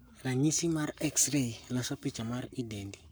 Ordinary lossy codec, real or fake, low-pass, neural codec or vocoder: none; fake; none; codec, 44.1 kHz, 7.8 kbps, Pupu-Codec